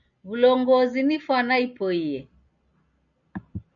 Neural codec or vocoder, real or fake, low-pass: none; real; 7.2 kHz